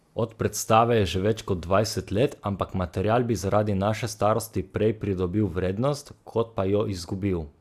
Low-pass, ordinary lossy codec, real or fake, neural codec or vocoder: 14.4 kHz; Opus, 64 kbps; real; none